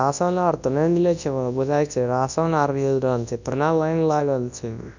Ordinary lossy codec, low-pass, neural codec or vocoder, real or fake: none; 7.2 kHz; codec, 24 kHz, 0.9 kbps, WavTokenizer, large speech release; fake